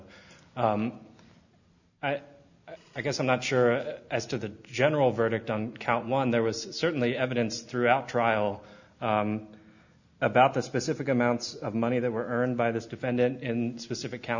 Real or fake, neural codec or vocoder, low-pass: real; none; 7.2 kHz